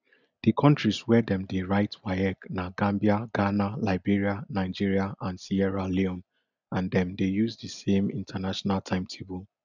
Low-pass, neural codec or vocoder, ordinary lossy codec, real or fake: 7.2 kHz; none; none; real